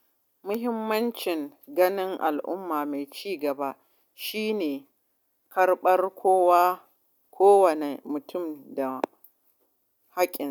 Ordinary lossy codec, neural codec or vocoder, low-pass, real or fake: none; none; 19.8 kHz; real